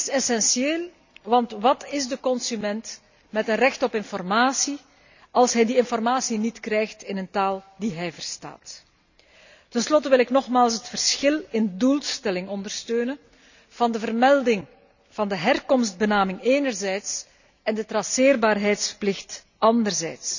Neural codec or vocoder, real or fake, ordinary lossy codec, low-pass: none; real; none; 7.2 kHz